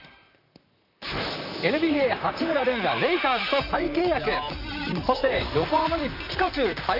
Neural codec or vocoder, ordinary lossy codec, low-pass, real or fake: vocoder, 44.1 kHz, 128 mel bands, Pupu-Vocoder; none; 5.4 kHz; fake